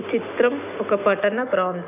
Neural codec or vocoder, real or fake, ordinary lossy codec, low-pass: none; real; none; 3.6 kHz